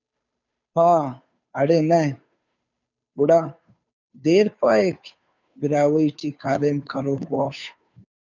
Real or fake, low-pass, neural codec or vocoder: fake; 7.2 kHz; codec, 16 kHz, 2 kbps, FunCodec, trained on Chinese and English, 25 frames a second